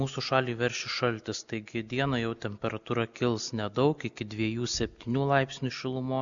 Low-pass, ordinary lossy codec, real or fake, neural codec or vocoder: 7.2 kHz; MP3, 96 kbps; real; none